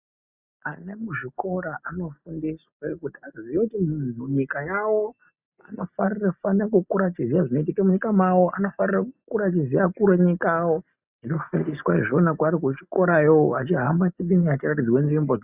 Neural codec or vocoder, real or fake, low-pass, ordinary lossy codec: none; real; 3.6 kHz; Opus, 64 kbps